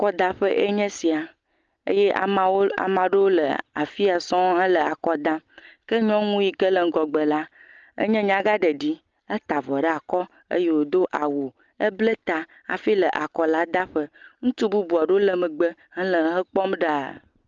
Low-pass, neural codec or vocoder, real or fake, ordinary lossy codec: 7.2 kHz; none; real; Opus, 24 kbps